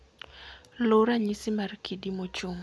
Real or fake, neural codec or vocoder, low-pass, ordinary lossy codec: real; none; none; none